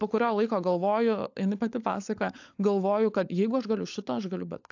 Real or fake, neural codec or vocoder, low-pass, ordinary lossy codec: fake; codec, 16 kHz, 16 kbps, FunCodec, trained on LibriTTS, 50 frames a second; 7.2 kHz; AAC, 48 kbps